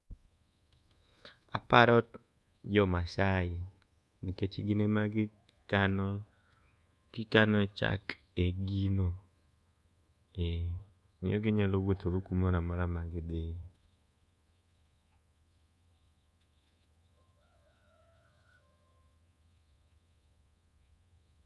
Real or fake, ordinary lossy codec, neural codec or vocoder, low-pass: fake; none; codec, 24 kHz, 1.2 kbps, DualCodec; none